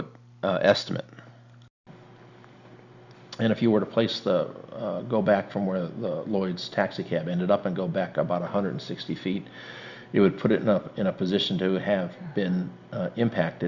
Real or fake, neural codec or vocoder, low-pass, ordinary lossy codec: real; none; 7.2 kHz; Opus, 64 kbps